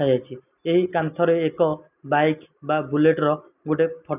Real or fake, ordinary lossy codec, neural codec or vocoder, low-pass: real; none; none; 3.6 kHz